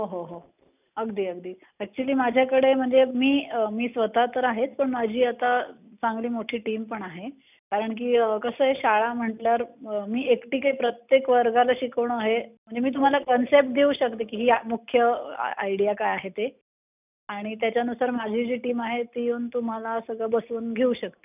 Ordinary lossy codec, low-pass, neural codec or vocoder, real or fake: none; 3.6 kHz; none; real